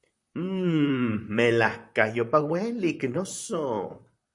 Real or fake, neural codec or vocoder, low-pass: fake; vocoder, 44.1 kHz, 128 mel bands, Pupu-Vocoder; 10.8 kHz